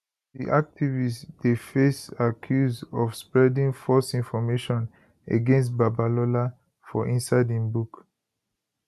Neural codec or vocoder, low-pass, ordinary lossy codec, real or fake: none; 14.4 kHz; none; real